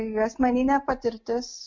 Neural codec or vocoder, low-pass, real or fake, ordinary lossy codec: none; 7.2 kHz; real; MP3, 64 kbps